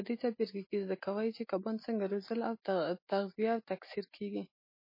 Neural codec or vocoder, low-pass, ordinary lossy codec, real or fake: none; 5.4 kHz; MP3, 24 kbps; real